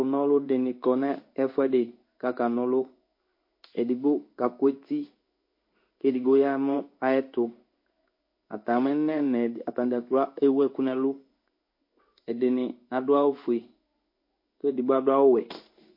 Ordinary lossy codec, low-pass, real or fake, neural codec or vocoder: MP3, 32 kbps; 5.4 kHz; fake; codec, 16 kHz in and 24 kHz out, 1 kbps, XY-Tokenizer